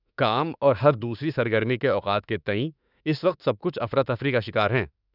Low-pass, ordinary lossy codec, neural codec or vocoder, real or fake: 5.4 kHz; none; autoencoder, 48 kHz, 32 numbers a frame, DAC-VAE, trained on Japanese speech; fake